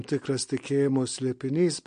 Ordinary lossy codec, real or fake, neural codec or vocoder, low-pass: MP3, 48 kbps; real; none; 9.9 kHz